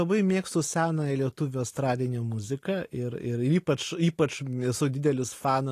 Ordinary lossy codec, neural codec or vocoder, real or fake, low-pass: AAC, 48 kbps; none; real; 14.4 kHz